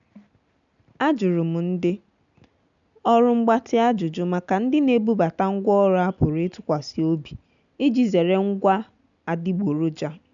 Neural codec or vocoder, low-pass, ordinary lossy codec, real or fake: none; 7.2 kHz; MP3, 96 kbps; real